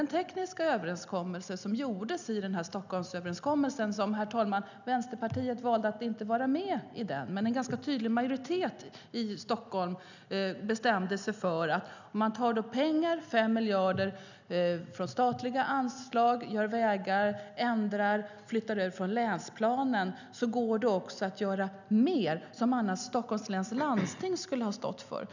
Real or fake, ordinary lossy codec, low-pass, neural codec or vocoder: real; none; 7.2 kHz; none